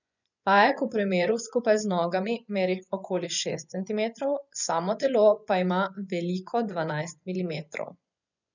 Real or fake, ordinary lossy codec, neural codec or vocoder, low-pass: fake; none; vocoder, 24 kHz, 100 mel bands, Vocos; 7.2 kHz